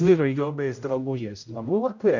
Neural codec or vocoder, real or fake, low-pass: codec, 16 kHz, 0.5 kbps, X-Codec, HuBERT features, trained on general audio; fake; 7.2 kHz